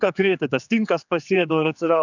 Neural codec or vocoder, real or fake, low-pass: codec, 16 kHz, 4 kbps, X-Codec, HuBERT features, trained on general audio; fake; 7.2 kHz